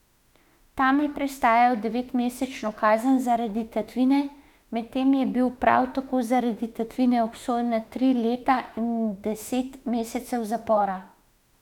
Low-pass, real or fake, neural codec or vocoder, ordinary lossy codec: 19.8 kHz; fake; autoencoder, 48 kHz, 32 numbers a frame, DAC-VAE, trained on Japanese speech; none